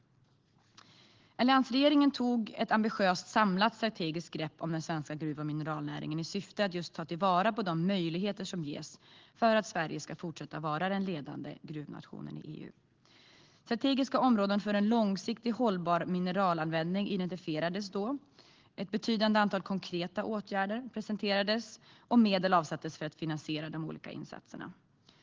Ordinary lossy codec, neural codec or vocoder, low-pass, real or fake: Opus, 16 kbps; none; 7.2 kHz; real